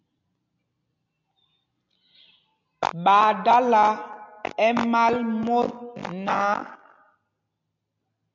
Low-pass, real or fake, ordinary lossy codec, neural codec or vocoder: 7.2 kHz; real; MP3, 64 kbps; none